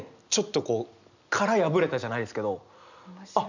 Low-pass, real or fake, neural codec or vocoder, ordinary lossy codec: 7.2 kHz; real; none; none